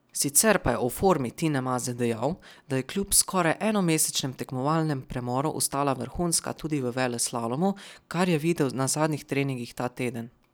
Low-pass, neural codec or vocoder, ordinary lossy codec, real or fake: none; none; none; real